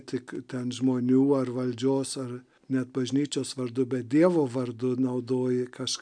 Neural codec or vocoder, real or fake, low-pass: none; real; 9.9 kHz